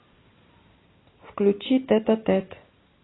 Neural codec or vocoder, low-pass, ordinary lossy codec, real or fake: none; 7.2 kHz; AAC, 16 kbps; real